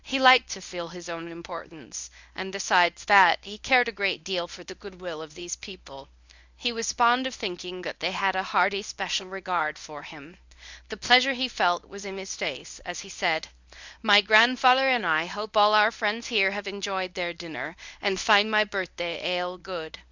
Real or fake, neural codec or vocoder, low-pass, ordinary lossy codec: fake; codec, 24 kHz, 0.9 kbps, WavTokenizer, medium speech release version 1; 7.2 kHz; Opus, 64 kbps